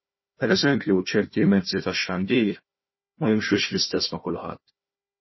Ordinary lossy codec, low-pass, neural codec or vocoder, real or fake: MP3, 24 kbps; 7.2 kHz; codec, 16 kHz, 1 kbps, FunCodec, trained on Chinese and English, 50 frames a second; fake